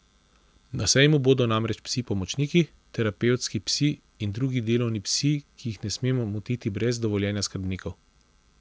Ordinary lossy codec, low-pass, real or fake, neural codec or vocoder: none; none; real; none